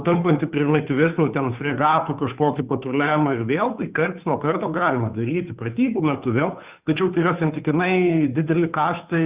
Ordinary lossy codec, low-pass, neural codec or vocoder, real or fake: Opus, 64 kbps; 3.6 kHz; codec, 16 kHz, 2 kbps, FunCodec, trained on Chinese and English, 25 frames a second; fake